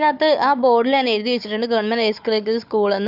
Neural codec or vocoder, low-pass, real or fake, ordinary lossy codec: codec, 16 kHz, 4 kbps, FunCodec, trained on Chinese and English, 50 frames a second; 5.4 kHz; fake; none